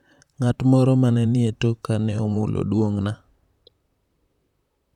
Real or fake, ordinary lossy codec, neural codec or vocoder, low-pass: fake; none; vocoder, 44.1 kHz, 128 mel bands, Pupu-Vocoder; 19.8 kHz